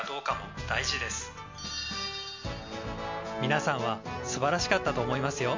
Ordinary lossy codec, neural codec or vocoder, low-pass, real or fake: MP3, 48 kbps; none; 7.2 kHz; real